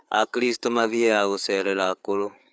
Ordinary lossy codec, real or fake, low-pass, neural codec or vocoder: none; fake; none; codec, 16 kHz, 4 kbps, FreqCodec, larger model